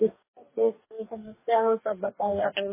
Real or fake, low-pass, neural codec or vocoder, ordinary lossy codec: fake; 3.6 kHz; codec, 44.1 kHz, 2.6 kbps, DAC; MP3, 16 kbps